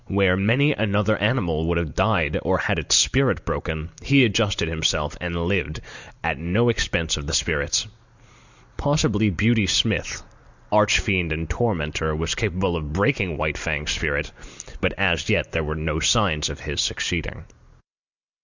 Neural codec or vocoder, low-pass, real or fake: none; 7.2 kHz; real